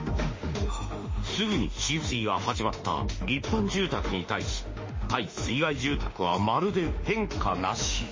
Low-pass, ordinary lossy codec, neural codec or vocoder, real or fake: 7.2 kHz; MP3, 32 kbps; autoencoder, 48 kHz, 32 numbers a frame, DAC-VAE, trained on Japanese speech; fake